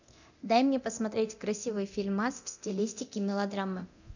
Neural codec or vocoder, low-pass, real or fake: codec, 24 kHz, 0.9 kbps, DualCodec; 7.2 kHz; fake